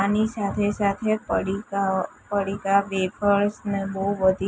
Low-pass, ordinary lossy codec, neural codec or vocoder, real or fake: none; none; none; real